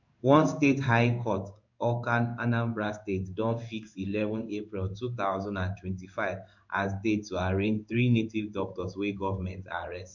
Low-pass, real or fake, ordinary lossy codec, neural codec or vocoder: 7.2 kHz; fake; none; codec, 16 kHz in and 24 kHz out, 1 kbps, XY-Tokenizer